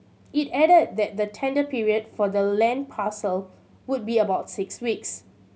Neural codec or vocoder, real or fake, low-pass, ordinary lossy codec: none; real; none; none